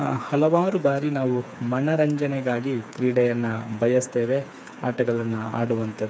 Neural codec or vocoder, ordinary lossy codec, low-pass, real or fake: codec, 16 kHz, 4 kbps, FreqCodec, smaller model; none; none; fake